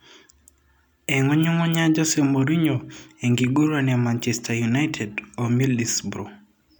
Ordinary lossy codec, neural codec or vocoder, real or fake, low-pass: none; none; real; none